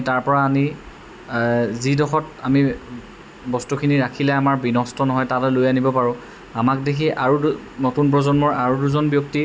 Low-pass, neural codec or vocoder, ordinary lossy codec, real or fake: none; none; none; real